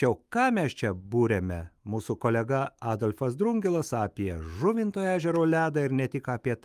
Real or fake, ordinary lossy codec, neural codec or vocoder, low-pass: fake; Opus, 32 kbps; autoencoder, 48 kHz, 128 numbers a frame, DAC-VAE, trained on Japanese speech; 14.4 kHz